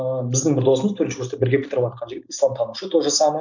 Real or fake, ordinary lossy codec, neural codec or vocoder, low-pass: real; none; none; 7.2 kHz